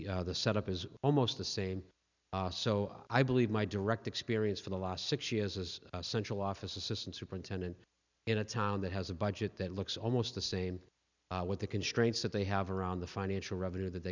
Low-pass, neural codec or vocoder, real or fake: 7.2 kHz; none; real